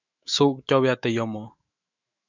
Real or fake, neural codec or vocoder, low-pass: fake; autoencoder, 48 kHz, 128 numbers a frame, DAC-VAE, trained on Japanese speech; 7.2 kHz